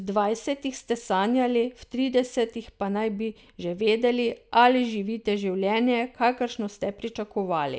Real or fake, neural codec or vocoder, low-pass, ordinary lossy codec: real; none; none; none